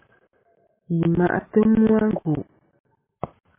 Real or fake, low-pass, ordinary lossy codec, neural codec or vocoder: real; 3.6 kHz; MP3, 24 kbps; none